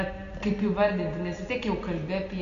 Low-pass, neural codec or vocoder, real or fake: 7.2 kHz; none; real